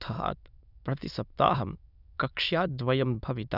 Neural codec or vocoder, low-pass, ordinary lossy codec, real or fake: autoencoder, 22.05 kHz, a latent of 192 numbers a frame, VITS, trained on many speakers; 5.4 kHz; none; fake